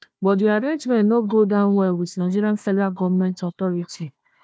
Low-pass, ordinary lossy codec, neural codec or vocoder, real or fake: none; none; codec, 16 kHz, 1 kbps, FunCodec, trained on Chinese and English, 50 frames a second; fake